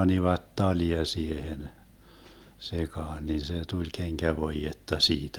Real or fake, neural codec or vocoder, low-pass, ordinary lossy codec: real; none; 19.8 kHz; Opus, 32 kbps